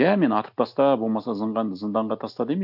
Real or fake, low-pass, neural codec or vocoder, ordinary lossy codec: real; 5.4 kHz; none; none